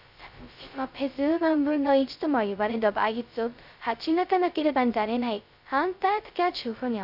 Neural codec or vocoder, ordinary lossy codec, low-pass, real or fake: codec, 16 kHz, 0.2 kbps, FocalCodec; none; 5.4 kHz; fake